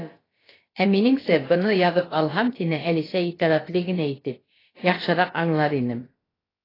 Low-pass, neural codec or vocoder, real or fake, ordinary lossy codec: 5.4 kHz; codec, 16 kHz, about 1 kbps, DyCAST, with the encoder's durations; fake; AAC, 24 kbps